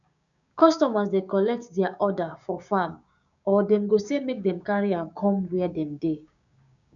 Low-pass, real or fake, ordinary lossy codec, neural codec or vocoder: 7.2 kHz; fake; none; codec, 16 kHz, 6 kbps, DAC